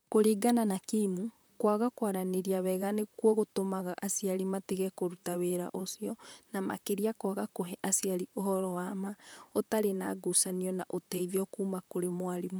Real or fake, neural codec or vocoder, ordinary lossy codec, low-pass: fake; vocoder, 44.1 kHz, 128 mel bands, Pupu-Vocoder; none; none